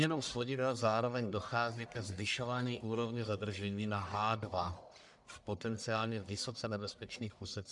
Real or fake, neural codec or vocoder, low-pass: fake; codec, 44.1 kHz, 1.7 kbps, Pupu-Codec; 10.8 kHz